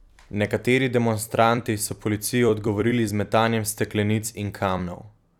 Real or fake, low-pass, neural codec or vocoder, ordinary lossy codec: fake; 19.8 kHz; vocoder, 44.1 kHz, 128 mel bands every 256 samples, BigVGAN v2; none